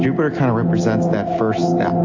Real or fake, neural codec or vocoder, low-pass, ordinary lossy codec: real; none; 7.2 kHz; AAC, 48 kbps